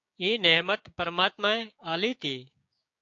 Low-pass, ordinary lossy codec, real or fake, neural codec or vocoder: 7.2 kHz; AAC, 48 kbps; fake; codec, 16 kHz, 6 kbps, DAC